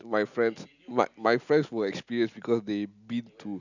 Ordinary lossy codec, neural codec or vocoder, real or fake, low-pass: none; none; real; 7.2 kHz